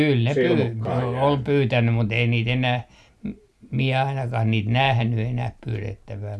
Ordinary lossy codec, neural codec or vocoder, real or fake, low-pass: none; none; real; none